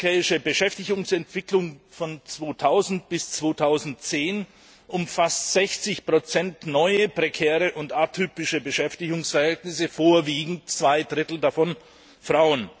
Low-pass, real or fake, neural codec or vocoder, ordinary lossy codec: none; real; none; none